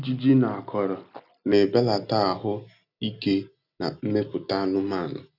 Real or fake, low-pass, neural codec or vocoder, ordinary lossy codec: real; 5.4 kHz; none; none